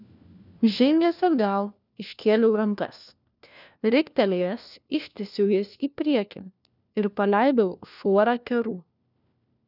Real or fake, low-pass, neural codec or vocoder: fake; 5.4 kHz; codec, 16 kHz, 1 kbps, FunCodec, trained on LibriTTS, 50 frames a second